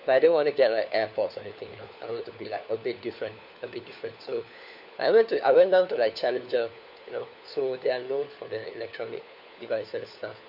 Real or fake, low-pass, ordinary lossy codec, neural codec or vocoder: fake; 5.4 kHz; none; codec, 16 kHz, 4 kbps, FunCodec, trained on LibriTTS, 50 frames a second